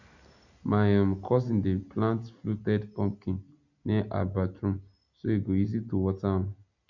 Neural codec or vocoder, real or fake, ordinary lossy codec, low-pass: none; real; none; 7.2 kHz